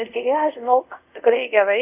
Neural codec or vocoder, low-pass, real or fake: codec, 24 kHz, 0.5 kbps, DualCodec; 3.6 kHz; fake